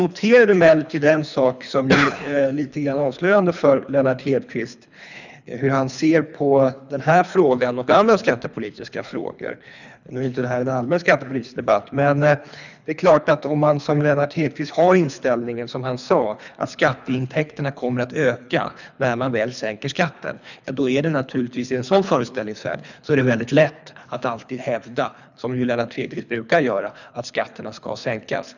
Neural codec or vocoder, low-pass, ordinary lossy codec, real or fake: codec, 24 kHz, 3 kbps, HILCodec; 7.2 kHz; none; fake